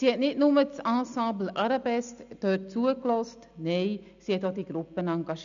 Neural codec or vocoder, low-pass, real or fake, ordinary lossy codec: none; 7.2 kHz; real; none